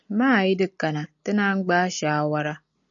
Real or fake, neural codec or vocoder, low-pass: real; none; 7.2 kHz